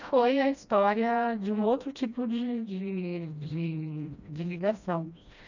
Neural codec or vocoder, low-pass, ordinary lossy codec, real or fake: codec, 16 kHz, 1 kbps, FreqCodec, smaller model; 7.2 kHz; none; fake